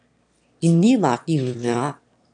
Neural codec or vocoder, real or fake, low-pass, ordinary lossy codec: autoencoder, 22.05 kHz, a latent of 192 numbers a frame, VITS, trained on one speaker; fake; 9.9 kHz; AAC, 64 kbps